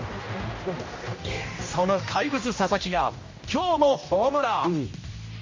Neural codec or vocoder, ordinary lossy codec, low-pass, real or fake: codec, 16 kHz, 1 kbps, X-Codec, HuBERT features, trained on general audio; MP3, 32 kbps; 7.2 kHz; fake